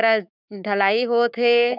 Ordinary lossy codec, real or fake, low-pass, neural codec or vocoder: none; fake; 5.4 kHz; codec, 16 kHz, 6 kbps, DAC